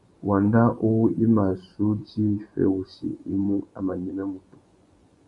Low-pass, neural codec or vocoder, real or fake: 10.8 kHz; vocoder, 24 kHz, 100 mel bands, Vocos; fake